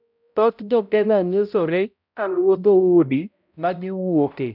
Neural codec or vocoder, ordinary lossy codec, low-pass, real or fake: codec, 16 kHz, 0.5 kbps, X-Codec, HuBERT features, trained on balanced general audio; none; 5.4 kHz; fake